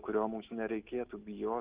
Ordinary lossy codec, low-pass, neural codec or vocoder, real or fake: Opus, 32 kbps; 3.6 kHz; none; real